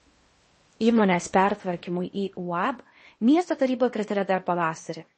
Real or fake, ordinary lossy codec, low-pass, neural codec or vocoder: fake; MP3, 32 kbps; 10.8 kHz; codec, 16 kHz in and 24 kHz out, 0.8 kbps, FocalCodec, streaming, 65536 codes